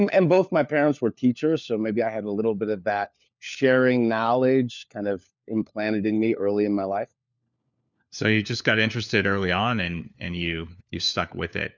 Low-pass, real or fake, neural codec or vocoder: 7.2 kHz; fake; codec, 16 kHz, 4 kbps, FunCodec, trained on LibriTTS, 50 frames a second